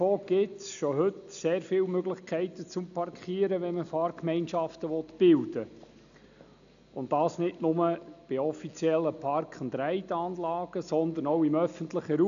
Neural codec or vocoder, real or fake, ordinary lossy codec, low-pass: none; real; none; 7.2 kHz